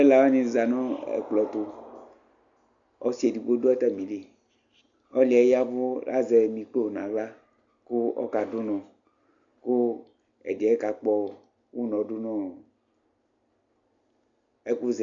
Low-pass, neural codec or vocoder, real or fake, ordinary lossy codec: 7.2 kHz; none; real; MP3, 96 kbps